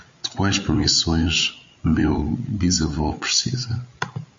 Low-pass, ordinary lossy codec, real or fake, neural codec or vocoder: 7.2 kHz; MP3, 48 kbps; fake; codec, 16 kHz, 8 kbps, FreqCodec, larger model